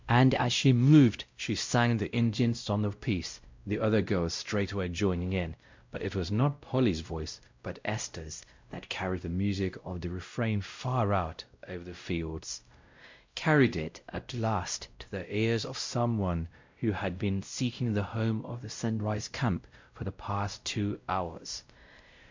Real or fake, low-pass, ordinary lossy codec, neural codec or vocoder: fake; 7.2 kHz; MP3, 64 kbps; codec, 16 kHz, 0.5 kbps, X-Codec, WavLM features, trained on Multilingual LibriSpeech